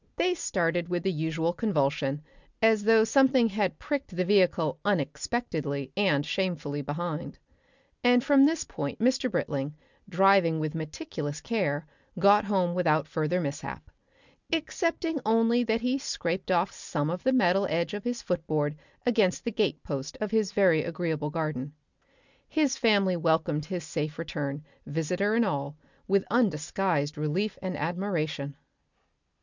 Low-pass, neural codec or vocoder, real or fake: 7.2 kHz; none; real